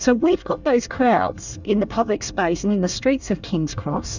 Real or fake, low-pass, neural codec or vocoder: fake; 7.2 kHz; codec, 24 kHz, 1 kbps, SNAC